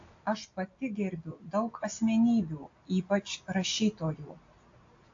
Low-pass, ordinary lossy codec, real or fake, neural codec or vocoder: 7.2 kHz; AAC, 48 kbps; real; none